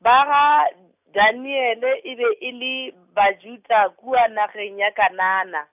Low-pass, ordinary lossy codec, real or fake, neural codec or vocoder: 3.6 kHz; none; real; none